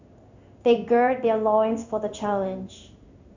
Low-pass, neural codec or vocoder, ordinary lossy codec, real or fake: 7.2 kHz; codec, 16 kHz in and 24 kHz out, 1 kbps, XY-Tokenizer; none; fake